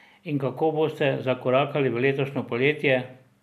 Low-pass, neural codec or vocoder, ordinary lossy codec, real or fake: 14.4 kHz; none; none; real